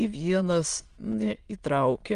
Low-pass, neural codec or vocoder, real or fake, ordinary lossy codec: 9.9 kHz; autoencoder, 22.05 kHz, a latent of 192 numbers a frame, VITS, trained on many speakers; fake; Opus, 24 kbps